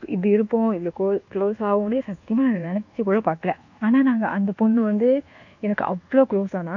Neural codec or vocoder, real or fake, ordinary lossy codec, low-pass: codec, 24 kHz, 1.2 kbps, DualCodec; fake; none; 7.2 kHz